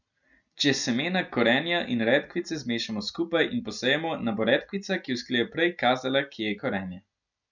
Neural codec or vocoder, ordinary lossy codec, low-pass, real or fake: none; none; 7.2 kHz; real